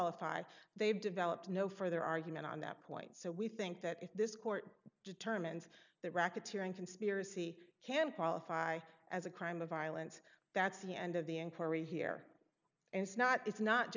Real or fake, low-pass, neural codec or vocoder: real; 7.2 kHz; none